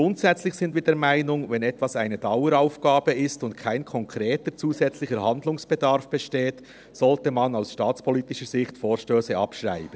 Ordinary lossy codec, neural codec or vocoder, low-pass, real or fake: none; none; none; real